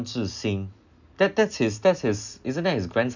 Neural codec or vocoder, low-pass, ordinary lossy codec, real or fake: none; 7.2 kHz; none; real